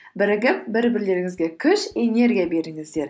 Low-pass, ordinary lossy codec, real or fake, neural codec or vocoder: none; none; real; none